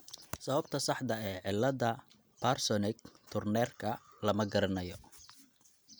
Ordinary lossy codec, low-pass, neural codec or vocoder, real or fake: none; none; none; real